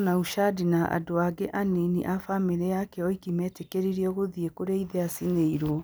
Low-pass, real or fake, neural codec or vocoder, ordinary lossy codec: none; real; none; none